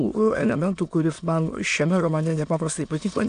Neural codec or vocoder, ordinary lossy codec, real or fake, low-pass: autoencoder, 22.05 kHz, a latent of 192 numbers a frame, VITS, trained on many speakers; AAC, 64 kbps; fake; 9.9 kHz